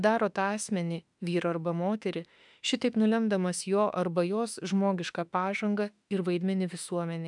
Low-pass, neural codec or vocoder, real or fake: 10.8 kHz; autoencoder, 48 kHz, 32 numbers a frame, DAC-VAE, trained on Japanese speech; fake